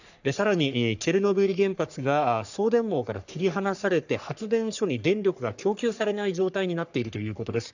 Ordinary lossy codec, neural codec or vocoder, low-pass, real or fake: none; codec, 44.1 kHz, 3.4 kbps, Pupu-Codec; 7.2 kHz; fake